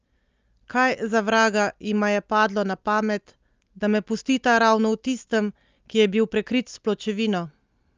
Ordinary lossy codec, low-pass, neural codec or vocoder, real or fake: Opus, 24 kbps; 7.2 kHz; none; real